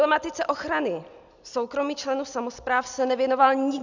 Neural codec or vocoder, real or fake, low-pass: none; real; 7.2 kHz